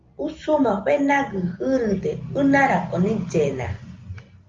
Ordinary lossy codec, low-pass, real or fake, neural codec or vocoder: Opus, 32 kbps; 7.2 kHz; real; none